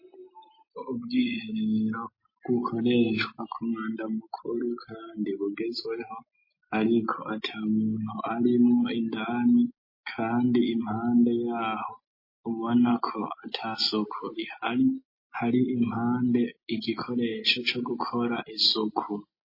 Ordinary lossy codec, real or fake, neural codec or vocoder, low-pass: MP3, 24 kbps; real; none; 5.4 kHz